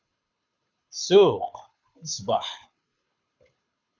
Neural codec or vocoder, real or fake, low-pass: codec, 24 kHz, 6 kbps, HILCodec; fake; 7.2 kHz